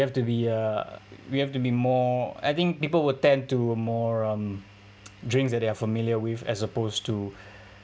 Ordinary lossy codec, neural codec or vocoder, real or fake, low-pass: none; none; real; none